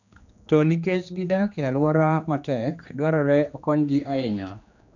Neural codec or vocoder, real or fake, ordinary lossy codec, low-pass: codec, 16 kHz, 2 kbps, X-Codec, HuBERT features, trained on general audio; fake; none; 7.2 kHz